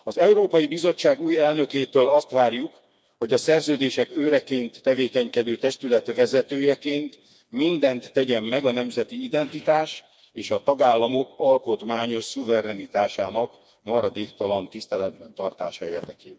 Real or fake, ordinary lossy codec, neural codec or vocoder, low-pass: fake; none; codec, 16 kHz, 2 kbps, FreqCodec, smaller model; none